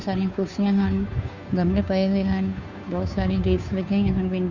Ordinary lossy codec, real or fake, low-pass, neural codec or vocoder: none; fake; 7.2 kHz; codec, 16 kHz, 2 kbps, FunCodec, trained on Chinese and English, 25 frames a second